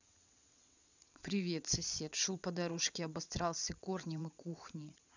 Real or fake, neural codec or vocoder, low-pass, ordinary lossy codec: fake; vocoder, 22.05 kHz, 80 mel bands, WaveNeXt; 7.2 kHz; none